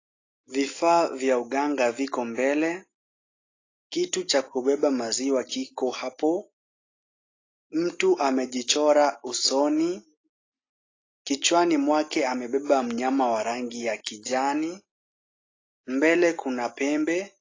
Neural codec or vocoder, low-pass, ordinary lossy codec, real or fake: none; 7.2 kHz; AAC, 32 kbps; real